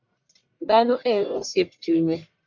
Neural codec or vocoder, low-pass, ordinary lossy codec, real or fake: codec, 44.1 kHz, 1.7 kbps, Pupu-Codec; 7.2 kHz; MP3, 64 kbps; fake